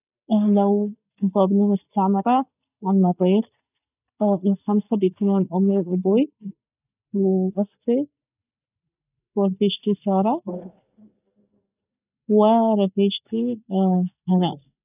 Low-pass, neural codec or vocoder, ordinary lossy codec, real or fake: 3.6 kHz; none; none; real